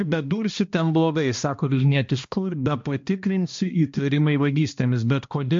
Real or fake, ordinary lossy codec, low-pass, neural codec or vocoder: fake; MP3, 48 kbps; 7.2 kHz; codec, 16 kHz, 1 kbps, X-Codec, HuBERT features, trained on balanced general audio